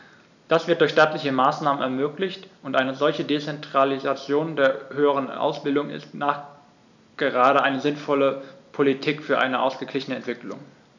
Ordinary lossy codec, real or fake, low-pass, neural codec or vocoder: none; real; 7.2 kHz; none